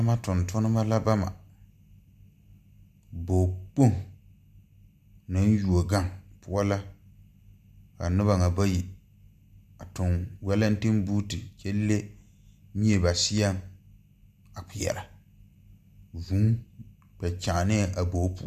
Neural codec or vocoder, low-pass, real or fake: none; 14.4 kHz; real